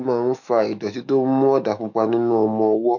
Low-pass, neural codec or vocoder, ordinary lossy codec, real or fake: 7.2 kHz; autoencoder, 48 kHz, 128 numbers a frame, DAC-VAE, trained on Japanese speech; MP3, 64 kbps; fake